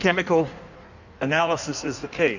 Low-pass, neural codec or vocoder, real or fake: 7.2 kHz; codec, 16 kHz in and 24 kHz out, 1.1 kbps, FireRedTTS-2 codec; fake